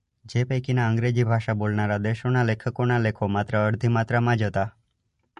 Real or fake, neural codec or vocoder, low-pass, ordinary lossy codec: real; none; 14.4 kHz; MP3, 48 kbps